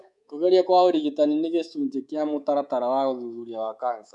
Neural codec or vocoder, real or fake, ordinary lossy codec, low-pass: codec, 24 kHz, 3.1 kbps, DualCodec; fake; none; 10.8 kHz